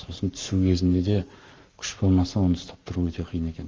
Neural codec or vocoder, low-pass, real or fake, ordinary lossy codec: codec, 44.1 kHz, 7.8 kbps, Pupu-Codec; 7.2 kHz; fake; Opus, 32 kbps